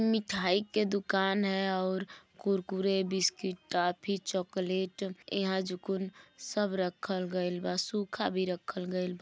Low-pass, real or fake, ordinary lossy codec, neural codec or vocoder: none; real; none; none